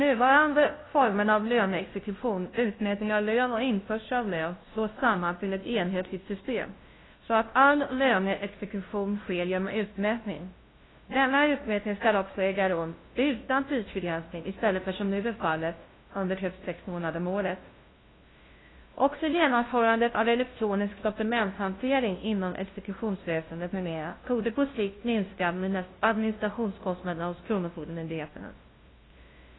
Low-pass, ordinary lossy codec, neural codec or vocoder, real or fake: 7.2 kHz; AAC, 16 kbps; codec, 16 kHz, 0.5 kbps, FunCodec, trained on LibriTTS, 25 frames a second; fake